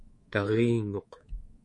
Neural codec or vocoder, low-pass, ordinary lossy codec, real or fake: codec, 24 kHz, 3.1 kbps, DualCodec; 10.8 kHz; MP3, 48 kbps; fake